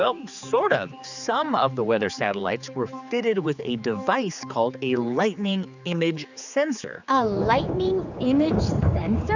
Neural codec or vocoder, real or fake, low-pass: codec, 16 kHz, 4 kbps, X-Codec, HuBERT features, trained on general audio; fake; 7.2 kHz